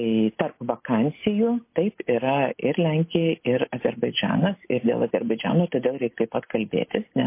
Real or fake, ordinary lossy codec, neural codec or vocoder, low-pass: real; MP3, 24 kbps; none; 3.6 kHz